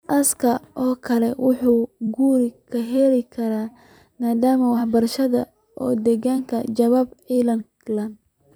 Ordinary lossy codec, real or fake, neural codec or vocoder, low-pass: none; fake; vocoder, 44.1 kHz, 128 mel bands every 256 samples, BigVGAN v2; none